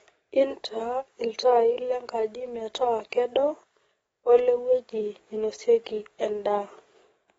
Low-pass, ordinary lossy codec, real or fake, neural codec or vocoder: 19.8 kHz; AAC, 24 kbps; fake; codec, 44.1 kHz, 7.8 kbps, DAC